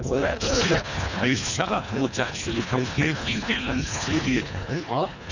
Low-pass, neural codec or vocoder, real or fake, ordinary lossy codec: 7.2 kHz; codec, 24 kHz, 1.5 kbps, HILCodec; fake; none